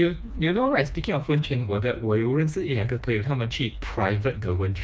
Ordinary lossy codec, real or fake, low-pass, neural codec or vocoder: none; fake; none; codec, 16 kHz, 2 kbps, FreqCodec, smaller model